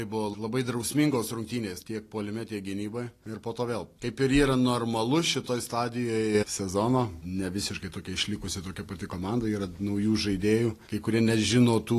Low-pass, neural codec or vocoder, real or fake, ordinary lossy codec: 14.4 kHz; none; real; AAC, 48 kbps